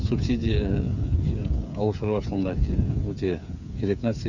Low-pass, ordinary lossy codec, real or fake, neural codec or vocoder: 7.2 kHz; none; fake; codec, 16 kHz, 8 kbps, FreqCodec, smaller model